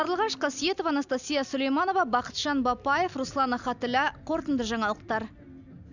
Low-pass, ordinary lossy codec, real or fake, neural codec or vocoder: 7.2 kHz; none; real; none